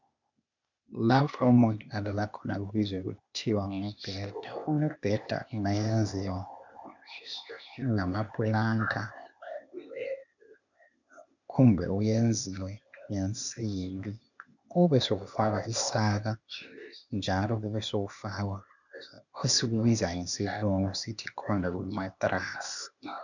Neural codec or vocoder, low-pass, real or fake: codec, 16 kHz, 0.8 kbps, ZipCodec; 7.2 kHz; fake